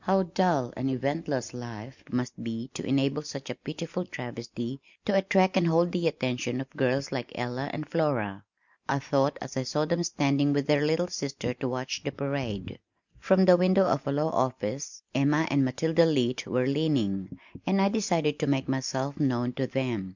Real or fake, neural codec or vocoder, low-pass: real; none; 7.2 kHz